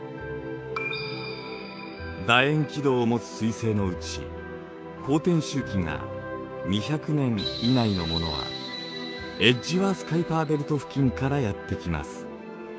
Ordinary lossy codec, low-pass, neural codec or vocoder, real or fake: none; none; codec, 16 kHz, 6 kbps, DAC; fake